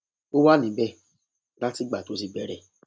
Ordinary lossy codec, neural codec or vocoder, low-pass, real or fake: none; none; none; real